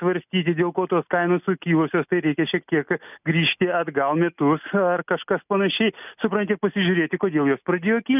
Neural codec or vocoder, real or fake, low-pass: none; real; 3.6 kHz